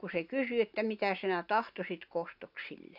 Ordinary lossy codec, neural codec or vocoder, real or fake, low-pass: none; none; real; 5.4 kHz